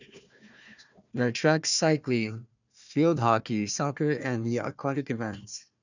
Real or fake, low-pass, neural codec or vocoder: fake; 7.2 kHz; codec, 16 kHz, 1 kbps, FunCodec, trained on Chinese and English, 50 frames a second